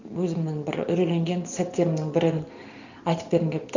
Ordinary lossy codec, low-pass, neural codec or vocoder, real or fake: none; 7.2 kHz; none; real